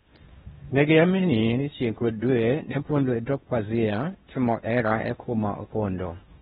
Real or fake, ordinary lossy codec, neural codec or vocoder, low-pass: fake; AAC, 16 kbps; codec, 16 kHz in and 24 kHz out, 0.8 kbps, FocalCodec, streaming, 65536 codes; 10.8 kHz